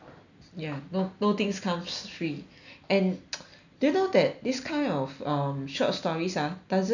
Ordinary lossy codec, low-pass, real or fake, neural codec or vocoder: none; 7.2 kHz; real; none